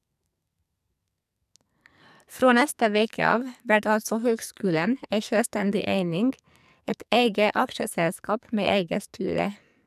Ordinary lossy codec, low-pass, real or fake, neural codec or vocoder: none; 14.4 kHz; fake; codec, 44.1 kHz, 2.6 kbps, SNAC